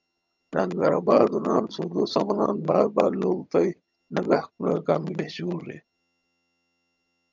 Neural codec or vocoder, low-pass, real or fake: vocoder, 22.05 kHz, 80 mel bands, HiFi-GAN; 7.2 kHz; fake